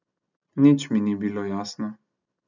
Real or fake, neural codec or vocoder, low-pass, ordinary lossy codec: real; none; 7.2 kHz; none